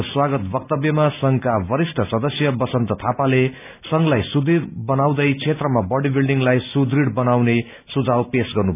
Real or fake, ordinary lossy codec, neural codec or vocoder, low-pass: real; none; none; 3.6 kHz